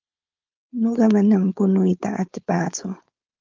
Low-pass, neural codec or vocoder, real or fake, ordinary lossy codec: 7.2 kHz; codec, 16 kHz, 4.8 kbps, FACodec; fake; Opus, 32 kbps